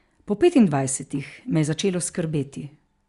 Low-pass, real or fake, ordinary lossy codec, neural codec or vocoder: 10.8 kHz; real; Opus, 64 kbps; none